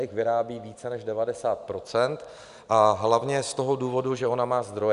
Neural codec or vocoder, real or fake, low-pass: none; real; 10.8 kHz